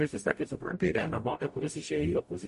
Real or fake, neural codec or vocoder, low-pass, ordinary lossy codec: fake; codec, 44.1 kHz, 0.9 kbps, DAC; 14.4 kHz; MP3, 48 kbps